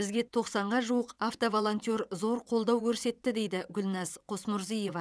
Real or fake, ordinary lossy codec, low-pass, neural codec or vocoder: fake; none; none; vocoder, 22.05 kHz, 80 mel bands, WaveNeXt